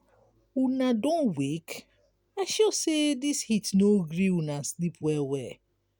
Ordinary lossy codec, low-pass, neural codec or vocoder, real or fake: none; none; none; real